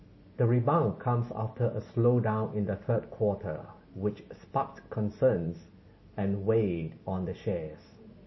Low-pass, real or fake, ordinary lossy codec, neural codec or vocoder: 7.2 kHz; real; MP3, 24 kbps; none